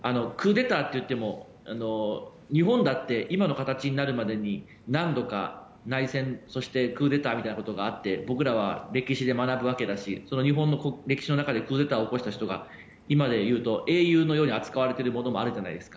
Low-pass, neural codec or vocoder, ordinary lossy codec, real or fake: none; none; none; real